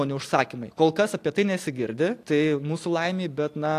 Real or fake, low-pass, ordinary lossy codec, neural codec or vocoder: real; 14.4 kHz; AAC, 64 kbps; none